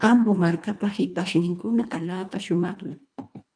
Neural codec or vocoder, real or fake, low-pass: codec, 24 kHz, 1.5 kbps, HILCodec; fake; 9.9 kHz